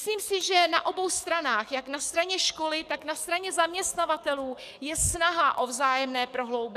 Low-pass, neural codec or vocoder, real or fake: 14.4 kHz; codec, 44.1 kHz, 7.8 kbps, DAC; fake